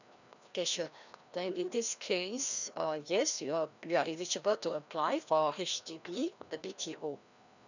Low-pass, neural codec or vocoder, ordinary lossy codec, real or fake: 7.2 kHz; codec, 16 kHz, 1 kbps, FreqCodec, larger model; none; fake